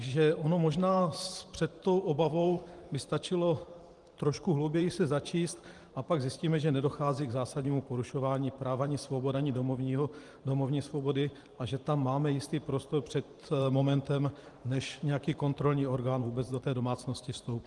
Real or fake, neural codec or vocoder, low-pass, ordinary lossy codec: fake; vocoder, 44.1 kHz, 128 mel bands every 512 samples, BigVGAN v2; 10.8 kHz; Opus, 24 kbps